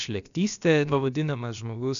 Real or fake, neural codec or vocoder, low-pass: fake; codec, 16 kHz, about 1 kbps, DyCAST, with the encoder's durations; 7.2 kHz